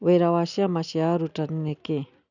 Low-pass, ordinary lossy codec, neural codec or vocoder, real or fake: 7.2 kHz; none; none; real